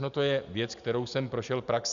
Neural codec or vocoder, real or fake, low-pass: none; real; 7.2 kHz